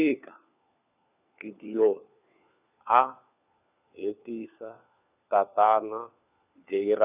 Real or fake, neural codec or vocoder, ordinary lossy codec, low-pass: fake; codec, 16 kHz, 4 kbps, FunCodec, trained on LibriTTS, 50 frames a second; none; 3.6 kHz